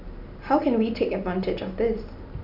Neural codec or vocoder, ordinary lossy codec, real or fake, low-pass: none; none; real; 5.4 kHz